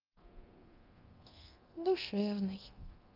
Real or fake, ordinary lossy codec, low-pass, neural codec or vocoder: fake; Opus, 32 kbps; 5.4 kHz; codec, 24 kHz, 0.9 kbps, DualCodec